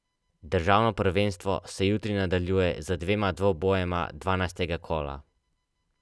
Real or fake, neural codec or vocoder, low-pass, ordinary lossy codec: real; none; none; none